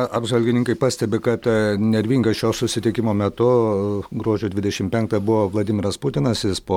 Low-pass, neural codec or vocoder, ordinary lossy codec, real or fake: 19.8 kHz; none; MP3, 96 kbps; real